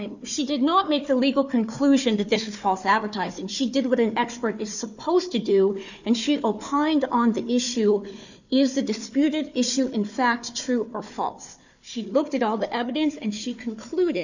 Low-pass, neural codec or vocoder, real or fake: 7.2 kHz; codec, 16 kHz, 4 kbps, FunCodec, trained on Chinese and English, 50 frames a second; fake